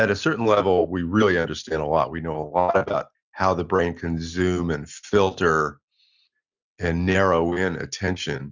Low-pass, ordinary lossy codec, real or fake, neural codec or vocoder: 7.2 kHz; Opus, 64 kbps; real; none